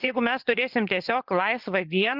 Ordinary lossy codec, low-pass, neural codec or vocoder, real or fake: Opus, 32 kbps; 5.4 kHz; vocoder, 24 kHz, 100 mel bands, Vocos; fake